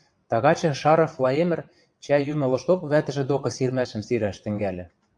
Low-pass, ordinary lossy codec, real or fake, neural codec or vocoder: 9.9 kHz; AAC, 64 kbps; fake; vocoder, 22.05 kHz, 80 mel bands, WaveNeXt